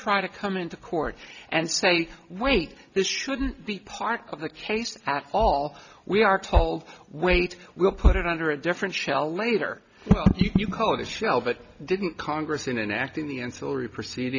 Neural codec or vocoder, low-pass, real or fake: none; 7.2 kHz; real